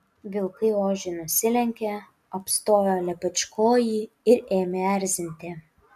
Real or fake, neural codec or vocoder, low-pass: real; none; 14.4 kHz